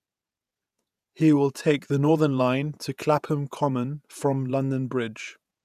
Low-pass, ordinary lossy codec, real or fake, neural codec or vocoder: 14.4 kHz; none; fake; vocoder, 48 kHz, 128 mel bands, Vocos